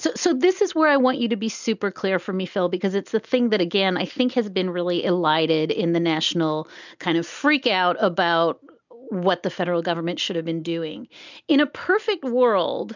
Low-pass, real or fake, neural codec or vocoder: 7.2 kHz; real; none